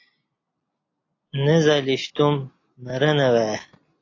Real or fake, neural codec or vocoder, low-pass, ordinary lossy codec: real; none; 7.2 kHz; AAC, 32 kbps